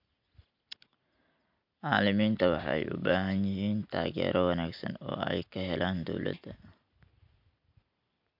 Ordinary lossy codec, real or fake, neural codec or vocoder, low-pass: MP3, 48 kbps; real; none; 5.4 kHz